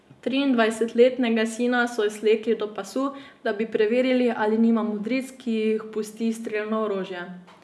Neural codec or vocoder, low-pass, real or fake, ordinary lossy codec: none; none; real; none